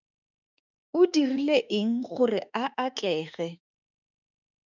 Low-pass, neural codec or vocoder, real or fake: 7.2 kHz; autoencoder, 48 kHz, 32 numbers a frame, DAC-VAE, trained on Japanese speech; fake